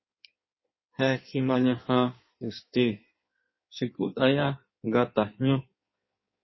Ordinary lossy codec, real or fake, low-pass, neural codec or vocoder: MP3, 24 kbps; fake; 7.2 kHz; codec, 16 kHz in and 24 kHz out, 1.1 kbps, FireRedTTS-2 codec